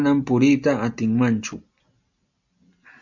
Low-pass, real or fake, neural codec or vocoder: 7.2 kHz; real; none